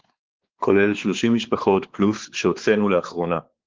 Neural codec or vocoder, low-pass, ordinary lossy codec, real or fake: codec, 16 kHz, 4 kbps, X-Codec, WavLM features, trained on Multilingual LibriSpeech; 7.2 kHz; Opus, 16 kbps; fake